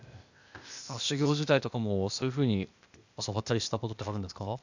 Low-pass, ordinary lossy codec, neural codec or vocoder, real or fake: 7.2 kHz; none; codec, 16 kHz, 0.8 kbps, ZipCodec; fake